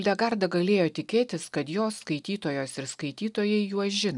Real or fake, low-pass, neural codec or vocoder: real; 10.8 kHz; none